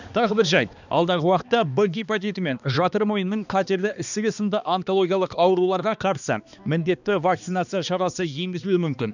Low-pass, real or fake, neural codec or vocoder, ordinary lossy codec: 7.2 kHz; fake; codec, 16 kHz, 2 kbps, X-Codec, HuBERT features, trained on balanced general audio; none